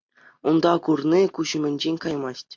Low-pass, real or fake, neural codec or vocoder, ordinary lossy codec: 7.2 kHz; real; none; MP3, 48 kbps